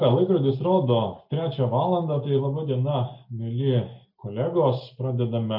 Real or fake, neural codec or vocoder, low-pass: real; none; 5.4 kHz